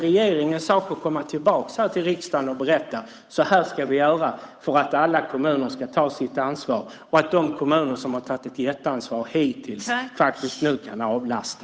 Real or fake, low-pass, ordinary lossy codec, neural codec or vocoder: fake; none; none; codec, 16 kHz, 8 kbps, FunCodec, trained on Chinese and English, 25 frames a second